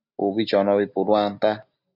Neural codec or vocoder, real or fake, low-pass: none; real; 5.4 kHz